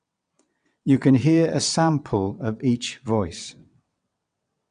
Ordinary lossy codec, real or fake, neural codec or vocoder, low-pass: none; fake; vocoder, 22.05 kHz, 80 mel bands, WaveNeXt; 9.9 kHz